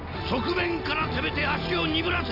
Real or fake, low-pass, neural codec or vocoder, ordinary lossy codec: real; 5.4 kHz; none; none